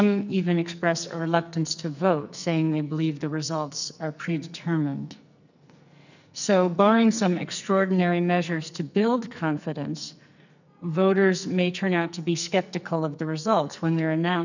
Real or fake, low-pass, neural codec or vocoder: fake; 7.2 kHz; codec, 44.1 kHz, 2.6 kbps, SNAC